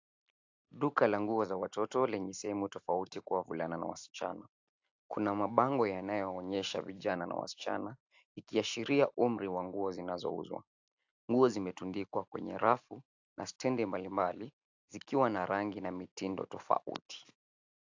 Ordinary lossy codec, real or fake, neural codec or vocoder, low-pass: AAC, 48 kbps; real; none; 7.2 kHz